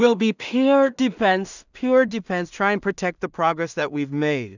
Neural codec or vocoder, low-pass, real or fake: codec, 16 kHz in and 24 kHz out, 0.4 kbps, LongCat-Audio-Codec, two codebook decoder; 7.2 kHz; fake